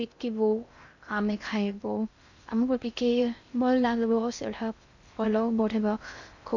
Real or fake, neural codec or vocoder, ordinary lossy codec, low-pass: fake; codec, 16 kHz in and 24 kHz out, 0.6 kbps, FocalCodec, streaming, 2048 codes; none; 7.2 kHz